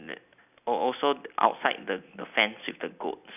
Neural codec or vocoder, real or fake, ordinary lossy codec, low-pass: none; real; none; 3.6 kHz